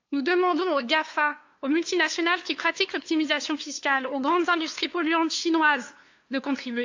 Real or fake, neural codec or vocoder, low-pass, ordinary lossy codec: fake; codec, 16 kHz, 2 kbps, FunCodec, trained on LibriTTS, 25 frames a second; 7.2 kHz; AAC, 48 kbps